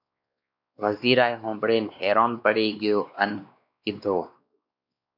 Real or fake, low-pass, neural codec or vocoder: fake; 5.4 kHz; codec, 16 kHz, 2 kbps, X-Codec, WavLM features, trained on Multilingual LibriSpeech